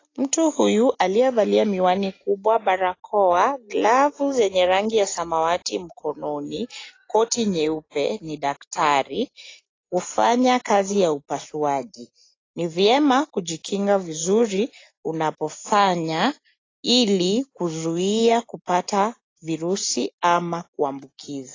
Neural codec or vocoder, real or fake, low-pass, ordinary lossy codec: none; real; 7.2 kHz; AAC, 32 kbps